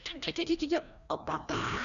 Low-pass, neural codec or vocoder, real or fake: 7.2 kHz; codec, 16 kHz, 1 kbps, FreqCodec, larger model; fake